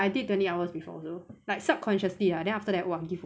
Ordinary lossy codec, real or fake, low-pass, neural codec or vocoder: none; real; none; none